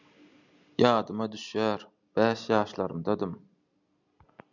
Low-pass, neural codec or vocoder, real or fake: 7.2 kHz; none; real